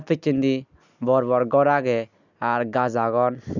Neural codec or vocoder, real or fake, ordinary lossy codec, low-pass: none; real; none; 7.2 kHz